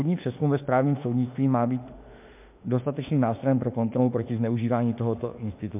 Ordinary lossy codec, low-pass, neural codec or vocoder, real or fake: MP3, 32 kbps; 3.6 kHz; autoencoder, 48 kHz, 32 numbers a frame, DAC-VAE, trained on Japanese speech; fake